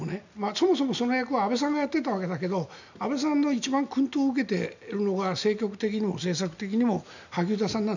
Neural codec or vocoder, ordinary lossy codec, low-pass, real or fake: none; none; 7.2 kHz; real